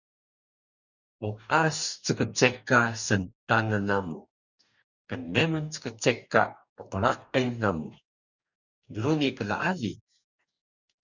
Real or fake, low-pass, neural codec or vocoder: fake; 7.2 kHz; codec, 44.1 kHz, 2.6 kbps, DAC